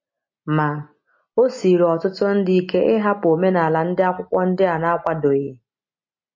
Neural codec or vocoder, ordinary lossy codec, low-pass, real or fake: none; MP3, 32 kbps; 7.2 kHz; real